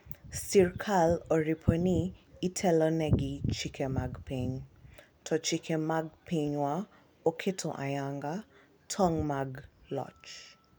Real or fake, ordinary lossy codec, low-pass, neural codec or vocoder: real; none; none; none